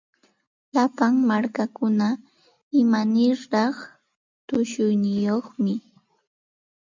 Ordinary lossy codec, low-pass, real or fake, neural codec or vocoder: MP3, 64 kbps; 7.2 kHz; real; none